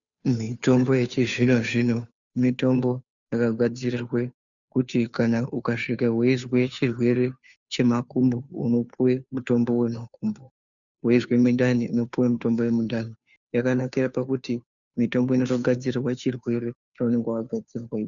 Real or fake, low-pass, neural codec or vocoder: fake; 7.2 kHz; codec, 16 kHz, 2 kbps, FunCodec, trained on Chinese and English, 25 frames a second